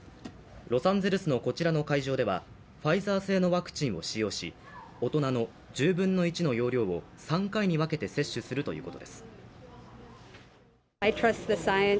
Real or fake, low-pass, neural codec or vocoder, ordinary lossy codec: real; none; none; none